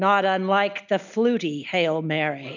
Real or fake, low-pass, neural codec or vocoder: real; 7.2 kHz; none